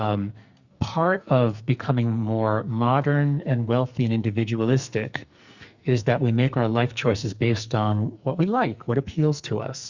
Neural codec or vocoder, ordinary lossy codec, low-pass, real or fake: codec, 44.1 kHz, 2.6 kbps, SNAC; Opus, 64 kbps; 7.2 kHz; fake